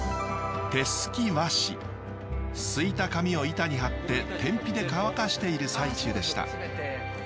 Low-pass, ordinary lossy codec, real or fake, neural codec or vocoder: none; none; real; none